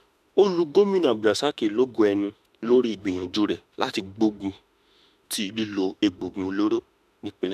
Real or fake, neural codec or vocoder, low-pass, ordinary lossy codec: fake; autoencoder, 48 kHz, 32 numbers a frame, DAC-VAE, trained on Japanese speech; 14.4 kHz; none